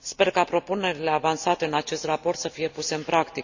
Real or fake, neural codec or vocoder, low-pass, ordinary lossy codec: real; none; 7.2 kHz; Opus, 64 kbps